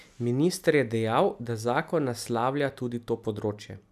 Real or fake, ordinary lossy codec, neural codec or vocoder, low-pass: real; none; none; 14.4 kHz